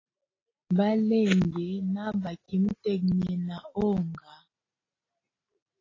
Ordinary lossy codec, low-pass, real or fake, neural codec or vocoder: AAC, 32 kbps; 7.2 kHz; real; none